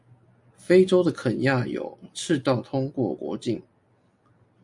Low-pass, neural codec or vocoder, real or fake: 10.8 kHz; none; real